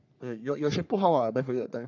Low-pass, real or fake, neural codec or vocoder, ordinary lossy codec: 7.2 kHz; fake; codec, 44.1 kHz, 3.4 kbps, Pupu-Codec; none